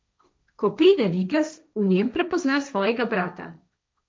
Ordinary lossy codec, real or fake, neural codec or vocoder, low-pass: none; fake; codec, 16 kHz, 1.1 kbps, Voila-Tokenizer; none